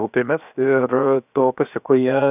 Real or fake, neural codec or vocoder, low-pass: fake; codec, 16 kHz, 0.7 kbps, FocalCodec; 3.6 kHz